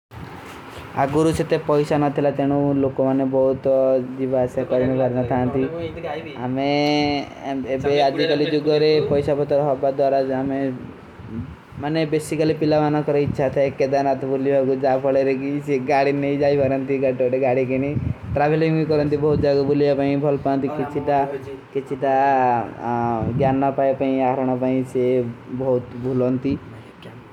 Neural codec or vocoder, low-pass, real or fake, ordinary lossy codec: none; 19.8 kHz; real; none